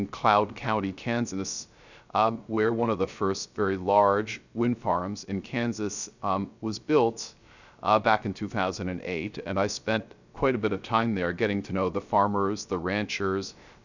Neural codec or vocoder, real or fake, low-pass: codec, 16 kHz, 0.3 kbps, FocalCodec; fake; 7.2 kHz